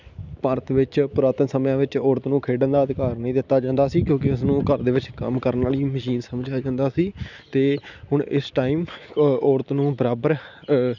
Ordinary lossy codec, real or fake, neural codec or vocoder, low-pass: none; real; none; 7.2 kHz